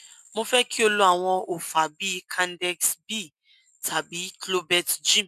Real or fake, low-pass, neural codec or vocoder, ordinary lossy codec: real; 14.4 kHz; none; MP3, 96 kbps